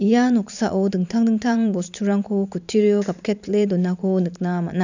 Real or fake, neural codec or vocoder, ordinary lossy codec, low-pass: fake; vocoder, 44.1 kHz, 128 mel bands every 512 samples, BigVGAN v2; none; 7.2 kHz